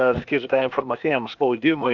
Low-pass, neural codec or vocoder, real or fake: 7.2 kHz; codec, 16 kHz, 0.8 kbps, ZipCodec; fake